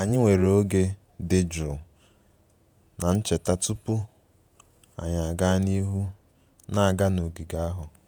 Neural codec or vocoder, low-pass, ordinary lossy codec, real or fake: vocoder, 48 kHz, 128 mel bands, Vocos; none; none; fake